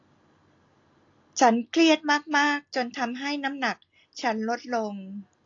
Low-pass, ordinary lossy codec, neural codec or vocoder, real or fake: 7.2 kHz; AAC, 48 kbps; none; real